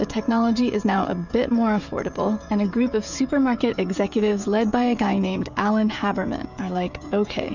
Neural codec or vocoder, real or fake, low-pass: codec, 16 kHz, 16 kbps, FreqCodec, smaller model; fake; 7.2 kHz